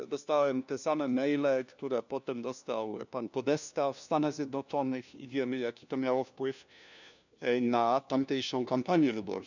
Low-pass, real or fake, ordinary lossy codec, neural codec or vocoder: 7.2 kHz; fake; none; codec, 16 kHz, 1 kbps, FunCodec, trained on LibriTTS, 50 frames a second